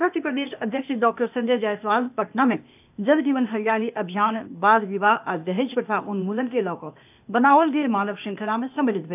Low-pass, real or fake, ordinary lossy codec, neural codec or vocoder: 3.6 kHz; fake; none; codec, 16 kHz, 0.8 kbps, ZipCodec